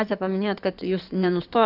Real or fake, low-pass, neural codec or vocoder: fake; 5.4 kHz; codec, 16 kHz in and 24 kHz out, 2.2 kbps, FireRedTTS-2 codec